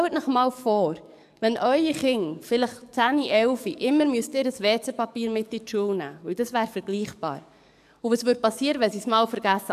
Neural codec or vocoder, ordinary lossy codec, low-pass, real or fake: codec, 44.1 kHz, 7.8 kbps, DAC; none; 14.4 kHz; fake